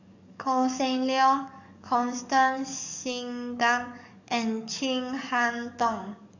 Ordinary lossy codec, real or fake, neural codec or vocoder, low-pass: none; fake; codec, 44.1 kHz, 7.8 kbps, DAC; 7.2 kHz